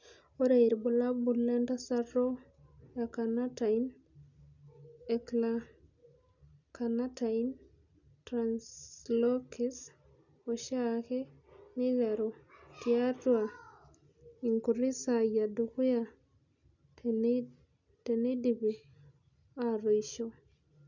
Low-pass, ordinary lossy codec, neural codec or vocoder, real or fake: 7.2 kHz; none; none; real